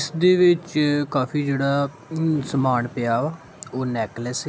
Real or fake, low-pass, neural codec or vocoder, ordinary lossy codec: real; none; none; none